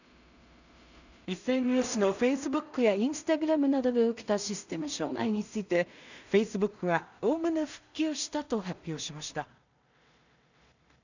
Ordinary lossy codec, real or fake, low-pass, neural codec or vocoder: none; fake; 7.2 kHz; codec, 16 kHz in and 24 kHz out, 0.4 kbps, LongCat-Audio-Codec, two codebook decoder